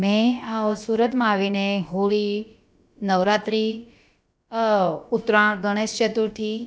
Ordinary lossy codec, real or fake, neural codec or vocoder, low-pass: none; fake; codec, 16 kHz, about 1 kbps, DyCAST, with the encoder's durations; none